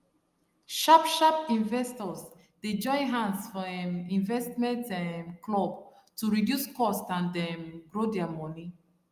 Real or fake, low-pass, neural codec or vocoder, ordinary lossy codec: real; 14.4 kHz; none; Opus, 32 kbps